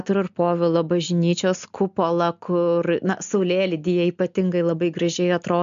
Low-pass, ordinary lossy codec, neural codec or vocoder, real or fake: 7.2 kHz; MP3, 64 kbps; none; real